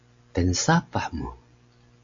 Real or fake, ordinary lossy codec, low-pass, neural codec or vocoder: real; AAC, 48 kbps; 7.2 kHz; none